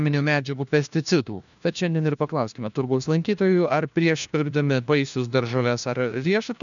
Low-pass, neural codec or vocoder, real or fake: 7.2 kHz; codec, 16 kHz, 1 kbps, FunCodec, trained on LibriTTS, 50 frames a second; fake